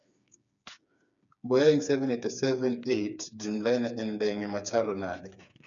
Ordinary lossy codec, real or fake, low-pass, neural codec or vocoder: none; fake; 7.2 kHz; codec, 16 kHz, 4 kbps, FreqCodec, smaller model